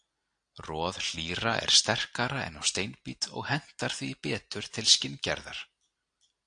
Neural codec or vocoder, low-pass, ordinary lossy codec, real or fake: none; 9.9 kHz; AAC, 64 kbps; real